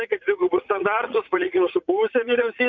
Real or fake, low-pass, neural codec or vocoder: fake; 7.2 kHz; vocoder, 44.1 kHz, 80 mel bands, Vocos